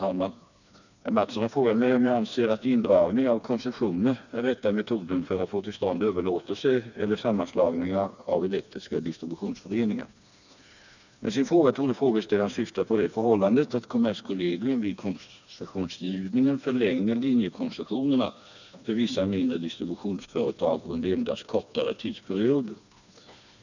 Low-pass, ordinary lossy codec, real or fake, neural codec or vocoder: 7.2 kHz; none; fake; codec, 16 kHz, 2 kbps, FreqCodec, smaller model